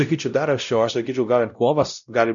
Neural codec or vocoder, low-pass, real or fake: codec, 16 kHz, 0.5 kbps, X-Codec, WavLM features, trained on Multilingual LibriSpeech; 7.2 kHz; fake